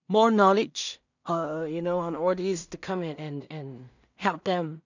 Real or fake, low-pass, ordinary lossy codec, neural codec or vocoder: fake; 7.2 kHz; none; codec, 16 kHz in and 24 kHz out, 0.4 kbps, LongCat-Audio-Codec, two codebook decoder